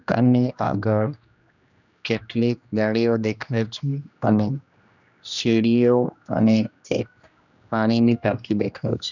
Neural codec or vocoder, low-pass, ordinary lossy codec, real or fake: codec, 16 kHz, 1 kbps, X-Codec, HuBERT features, trained on general audio; 7.2 kHz; none; fake